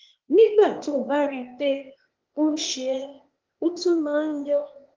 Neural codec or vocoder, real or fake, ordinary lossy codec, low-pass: codec, 16 kHz, 0.8 kbps, ZipCodec; fake; Opus, 32 kbps; 7.2 kHz